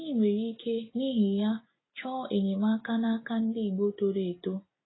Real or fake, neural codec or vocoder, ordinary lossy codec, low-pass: real; none; AAC, 16 kbps; 7.2 kHz